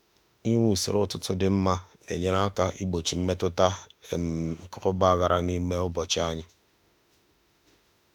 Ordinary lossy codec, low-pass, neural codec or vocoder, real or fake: none; 19.8 kHz; autoencoder, 48 kHz, 32 numbers a frame, DAC-VAE, trained on Japanese speech; fake